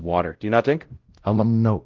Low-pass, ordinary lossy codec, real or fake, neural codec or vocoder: 7.2 kHz; Opus, 16 kbps; fake; codec, 16 kHz, 0.5 kbps, X-Codec, WavLM features, trained on Multilingual LibriSpeech